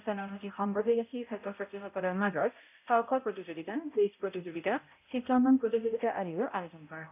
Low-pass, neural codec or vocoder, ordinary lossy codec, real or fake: 3.6 kHz; codec, 16 kHz, 0.5 kbps, X-Codec, HuBERT features, trained on balanced general audio; none; fake